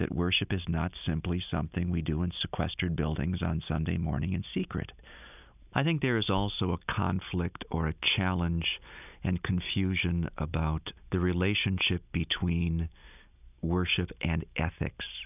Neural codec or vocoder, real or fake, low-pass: none; real; 3.6 kHz